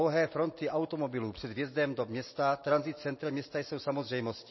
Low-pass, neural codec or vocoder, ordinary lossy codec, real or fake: 7.2 kHz; none; MP3, 24 kbps; real